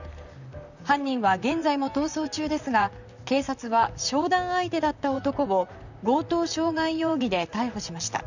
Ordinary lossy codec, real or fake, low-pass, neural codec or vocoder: none; fake; 7.2 kHz; vocoder, 44.1 kHz, 128 mel bands, Pupu-Vocoder